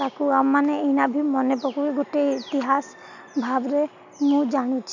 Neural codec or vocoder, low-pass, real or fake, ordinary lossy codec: none; 7.2 kHz; real; none